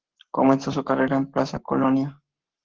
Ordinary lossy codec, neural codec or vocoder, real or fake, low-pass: Opus, 16 kbps; codec, 44.1 kHz, 7.8 kbps, Pupu-Codec; fake; 7.2 kHz